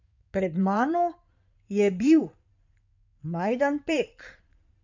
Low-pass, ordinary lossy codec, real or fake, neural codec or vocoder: 7.2 kHz; none; fake; codec, 16 kHz in and 24 kHz out, 2.2 kbps, FireRedTTS-2 codec